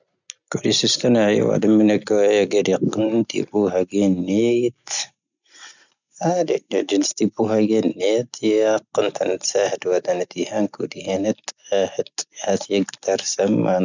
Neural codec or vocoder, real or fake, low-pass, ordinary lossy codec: none; real; 7.2 kHz; none